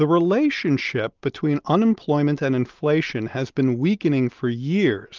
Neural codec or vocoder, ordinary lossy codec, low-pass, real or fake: none; Opus, 32 kbps; 7.2 kHz; real